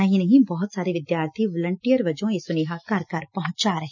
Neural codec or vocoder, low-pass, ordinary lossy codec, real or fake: none; 7.2 kHz; none; real